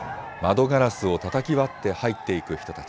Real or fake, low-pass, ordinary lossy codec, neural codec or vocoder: real; none; none; none